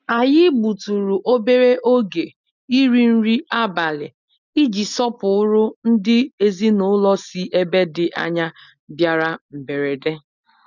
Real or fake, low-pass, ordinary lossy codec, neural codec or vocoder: real; 7.2 kHz; none; none